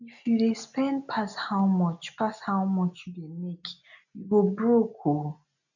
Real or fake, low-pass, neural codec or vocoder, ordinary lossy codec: real; 7.2 kHz; none; none